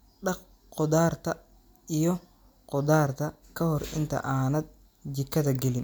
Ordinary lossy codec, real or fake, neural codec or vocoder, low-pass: none; real; none; none